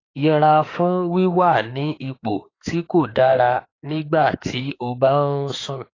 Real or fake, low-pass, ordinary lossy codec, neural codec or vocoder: fake; 7.2 kHz; AAC, 32 kbps; autoencoder, 48 kHz, 32 numbers a frame, DAC-VAE, trained on Japanese speech